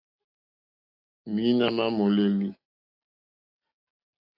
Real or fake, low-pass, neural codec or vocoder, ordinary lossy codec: real; 5.4 kHz; none; AAC, 48 kbps